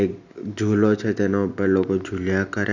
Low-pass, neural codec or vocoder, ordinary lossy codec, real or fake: 7.2 kHz; none; none; real